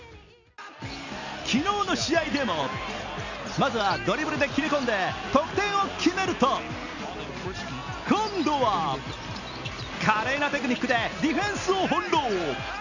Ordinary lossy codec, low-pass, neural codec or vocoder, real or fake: none; 7.2 kHz; none; real